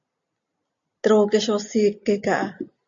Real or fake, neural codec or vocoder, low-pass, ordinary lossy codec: real; none; 7.2 kHz; AAC, 64 kbps